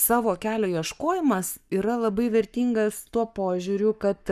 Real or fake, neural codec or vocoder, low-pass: fake; codec, 44.1 kHz, 7.8 kbps, Pupu-Codec; 14.4 kHz